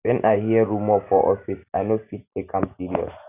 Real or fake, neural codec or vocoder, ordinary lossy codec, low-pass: real; none; none; 3.6 kHz